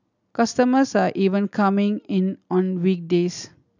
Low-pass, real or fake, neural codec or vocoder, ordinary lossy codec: 7.2 kHz; real; none; none